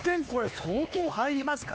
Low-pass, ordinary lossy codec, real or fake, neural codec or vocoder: none; none; fake; codec, 16 kHz, 2 kbps, X-Codec, HuBERT features, trained on LibriSpeech